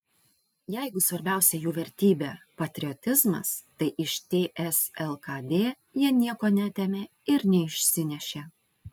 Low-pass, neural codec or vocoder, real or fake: 19.8 kHz; none; real